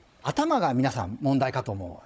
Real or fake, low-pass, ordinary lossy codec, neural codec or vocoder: fake; none; none; codec, 16 kHz, 16 kbps, FunCodec, trained on Chinese and English, 50 frames a second